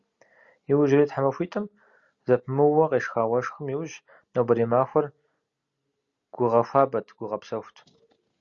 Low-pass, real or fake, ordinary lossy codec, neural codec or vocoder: 7.2 kHz; real; Opus, 64 kbps; none